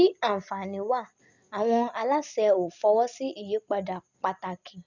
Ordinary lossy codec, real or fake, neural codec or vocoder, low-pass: none; real; none; 7.2 kHz